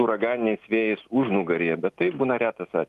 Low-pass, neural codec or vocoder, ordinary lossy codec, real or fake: 14.4 kHz; none; Opus, 32 kbps; real